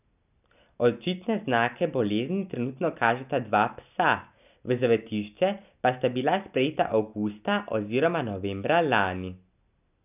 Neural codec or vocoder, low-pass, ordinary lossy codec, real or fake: none; 3.6 kHz; none; real